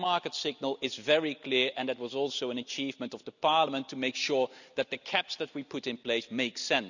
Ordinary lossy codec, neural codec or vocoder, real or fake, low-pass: none; none; real; 7.2 kHz